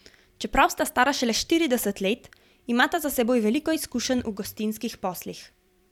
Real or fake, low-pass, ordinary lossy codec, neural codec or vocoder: fake; 19.8 kHz; none; vocoder, 44.1 kHz, 128 mel bands every 256 samples, BigVGAN v2